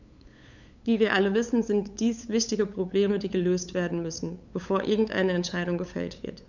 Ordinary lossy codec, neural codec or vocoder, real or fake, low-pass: none; codec, 16 kHz, 8 kbps, FunCodec, trained on LibriTTS, 25 frames a second; fake; 7.2 kHz